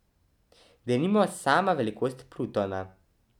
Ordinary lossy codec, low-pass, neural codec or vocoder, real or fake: none; 19.8 kHz; none; real